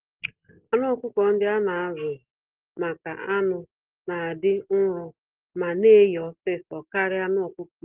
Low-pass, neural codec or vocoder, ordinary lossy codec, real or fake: 3.6 kHz; none; Opus, 16 kbps; real